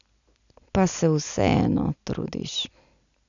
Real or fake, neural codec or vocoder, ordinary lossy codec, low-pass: real; none; none; 7.2 kHz